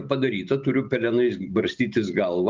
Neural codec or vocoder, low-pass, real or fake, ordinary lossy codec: none; 7.2 kHz; real; Opus, 32 kbps